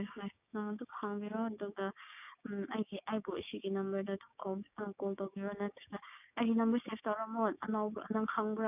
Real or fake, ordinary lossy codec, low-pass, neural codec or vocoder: fake; none; 3.6 kHz; codec, 16 kHz, 6 kbps, DAC